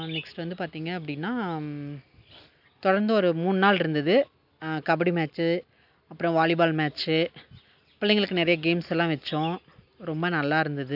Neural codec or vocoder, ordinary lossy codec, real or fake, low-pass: none; AAC, 48 kbps; real; 5.4 kHz